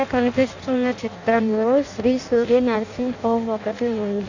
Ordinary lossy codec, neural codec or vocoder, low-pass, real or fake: Opus, 64 kbps; codec, 16 kHz in and 24 kHz out, 0.6 kbps, FireRedTTS-2 codec; 7.2 kHz; fake